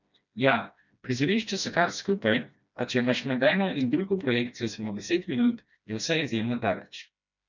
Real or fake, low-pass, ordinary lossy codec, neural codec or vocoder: fake; 7.2 kHz; none; codec, 16 kHz, 1 kbps, FreqCodec, smaller model